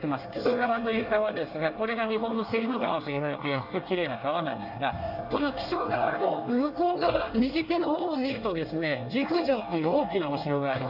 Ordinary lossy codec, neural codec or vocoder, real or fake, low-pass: none; codec, 24 kHz, 1 kbps, SNAC; fake; 5.4 kHz